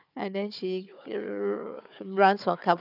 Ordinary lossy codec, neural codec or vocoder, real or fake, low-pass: none; codec, 16 kHz, 4 kbps, FunCodec, trained on Chinese and English, 50 frames a second; fake; 5.4 kHz